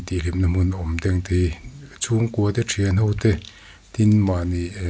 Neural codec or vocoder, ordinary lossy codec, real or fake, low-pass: none; none; real; none